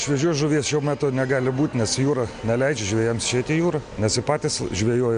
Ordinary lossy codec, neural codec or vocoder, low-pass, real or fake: MP3, 64 kbps; none; 9.9 kHz; real